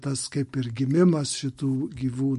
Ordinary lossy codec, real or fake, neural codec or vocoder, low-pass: MP3, 48 kbps; real; none; 14.4 kHz